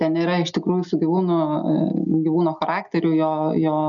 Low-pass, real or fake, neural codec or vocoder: 7.2 kHz; real; none